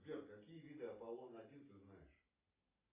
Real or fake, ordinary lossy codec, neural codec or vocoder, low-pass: real; AAC, 16 kbps; none; 3.6 kHz